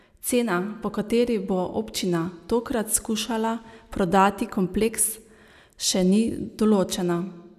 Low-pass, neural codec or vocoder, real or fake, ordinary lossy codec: 14.4 kHz; none; real; none